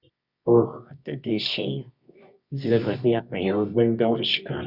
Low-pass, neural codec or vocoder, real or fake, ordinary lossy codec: 5.4 kHz; codec, 24 kHz, 0.9 kbps, WavTokenizer, medium music audio release; fake; Opus, 64 kbps